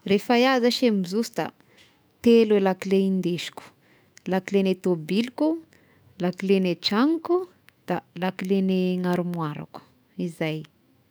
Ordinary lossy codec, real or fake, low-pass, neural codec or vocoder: none; fake; none; autoencoder, 48 kHz, 128 numbers a frame, DAC-VAE, trained on Japanese speech